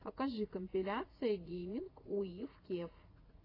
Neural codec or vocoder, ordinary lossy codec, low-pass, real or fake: vocoder, 44.1 kHz, 80 mel bands, Vocos; AAC, 24 kbps; 5.4 kHz; fake